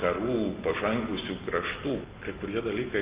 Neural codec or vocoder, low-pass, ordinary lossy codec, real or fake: none; 3.6 kHz; Opus, 32 kbps; real